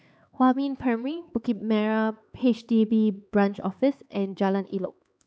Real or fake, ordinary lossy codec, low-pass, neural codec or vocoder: fake; none; none; codec, 16 kHz, 4 kbps, X-Codec, HuBERT features, trained on LibriSpeech